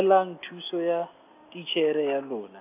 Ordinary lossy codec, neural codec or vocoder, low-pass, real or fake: AAC, 32 kbps; none; 3.6 kHz; real